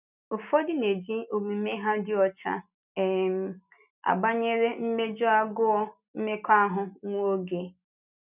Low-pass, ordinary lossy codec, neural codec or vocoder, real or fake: 3.6 kHz; none; none; real